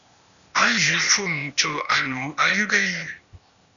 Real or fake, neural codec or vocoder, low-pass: fake; codec, 16 kHz, 0.8 kbps, ZipCodec; 7.2 kHz